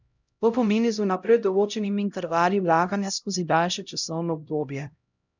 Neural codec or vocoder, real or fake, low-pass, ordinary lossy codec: codec, 16 kHz, 0.5 kbps, X-Codec, HuBERT features, trained on LibriSpeech; fake; 7.2 kHz; none